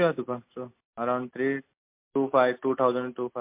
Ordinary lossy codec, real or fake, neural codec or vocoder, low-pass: MP3, 32 kbps; real; none; 3.6 kHz